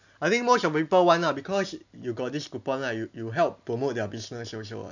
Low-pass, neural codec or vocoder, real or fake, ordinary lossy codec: 7.2 kHz; none; real; none